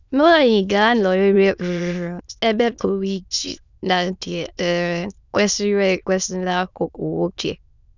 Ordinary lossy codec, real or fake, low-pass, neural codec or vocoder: none; fake; 7.2 kHz; autoencoder, 22.05 kHz, a latent of 192 numbers a frame, VITS, trained on many speakers